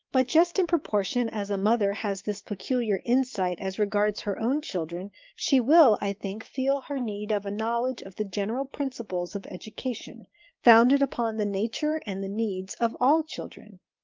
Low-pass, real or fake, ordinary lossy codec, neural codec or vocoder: 7.2 kHz; fake; Opus, 32 kbps; codec, 16 kHz, 6 kbps, DAC